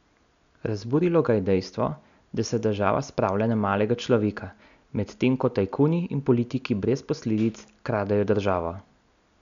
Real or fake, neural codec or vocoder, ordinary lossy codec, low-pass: real; none; none; 7.2 kHz